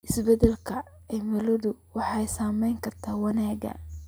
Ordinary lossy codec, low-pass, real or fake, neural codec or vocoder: none; none; real; none